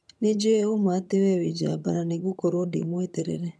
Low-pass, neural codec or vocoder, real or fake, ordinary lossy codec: none; vocoder, 22.05 kHz, 80 mel bands, HiFi-GAN; fake; none